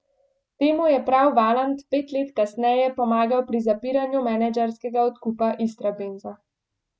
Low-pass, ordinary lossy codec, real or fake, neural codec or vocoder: none; none; real; none